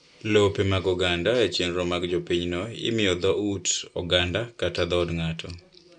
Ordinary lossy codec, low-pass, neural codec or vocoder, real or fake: none; 9.9 kHz; none; real